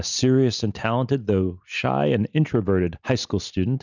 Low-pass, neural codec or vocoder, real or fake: 7.2 kHz; none; real